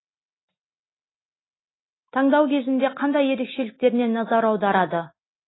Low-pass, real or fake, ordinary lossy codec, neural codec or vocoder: 7.2 kHz; real; AAC, 16 kbps; none